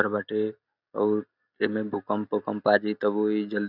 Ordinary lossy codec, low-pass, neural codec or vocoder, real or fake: none; 5.4 kHz; none; real